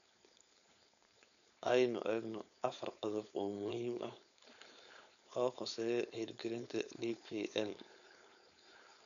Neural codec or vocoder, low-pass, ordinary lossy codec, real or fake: codec, 16 kHz, 4.8 kbps, FACodec; 7.2 kHz; none; fake